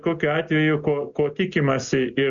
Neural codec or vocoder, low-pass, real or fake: none; 7.2 kHz; real